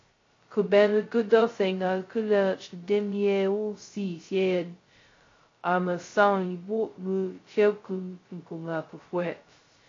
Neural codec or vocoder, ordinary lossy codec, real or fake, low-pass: codec, 16 kHz, 0.2 kbps, FocalCodec; AAC, 32 kbps; fake; 7.2 kHz